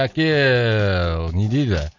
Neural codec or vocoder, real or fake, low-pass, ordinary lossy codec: none; real; 7.2 kHz; none